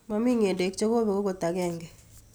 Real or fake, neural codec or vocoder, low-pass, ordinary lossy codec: real; none; none; none